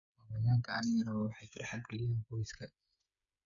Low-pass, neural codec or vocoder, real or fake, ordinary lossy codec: 7.2 kHz; codec, 16 kHz, 8 kbps, FreqCodec, smaller model; fake; MP3, 64 kbps